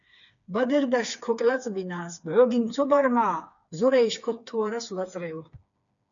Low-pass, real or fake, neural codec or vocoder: 7.2 kHz; fake; codec, 16 kHz, 4 kbps, FreqCodec, smaller model